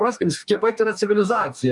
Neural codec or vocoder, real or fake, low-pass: codec, 44.1 kHz, 2.6 kbps, DAC; fake; 10.8 kHz